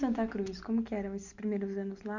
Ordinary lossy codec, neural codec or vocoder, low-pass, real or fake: none; none; 7.2 kHz; real